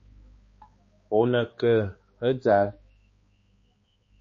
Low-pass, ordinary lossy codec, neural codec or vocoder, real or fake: 7.2 kHz; MP3, 32 kbps; codec, 16 kHz, 2 kbps, X-Codec, HuBERT features, trained on balanced general audio; fake